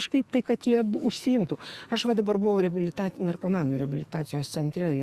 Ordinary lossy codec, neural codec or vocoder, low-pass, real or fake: Opus, 64 kbps; codec, 44.1 kHz, 2.6 kbps, SNAC; 14.4 kHz; fake